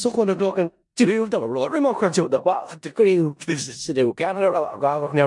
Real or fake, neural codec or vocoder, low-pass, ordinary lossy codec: fake; codec, 16 kHz in and 24 kHz out, 0.4 kbps, LongCat-Audio-Codec, four codebook decoder; 10.8 kHz; MP3, 64 kbps